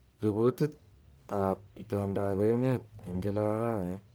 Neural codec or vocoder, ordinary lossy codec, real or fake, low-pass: codec, 44.1 kHz, 1.7 kbps, Pupu-Codec; none; fake; none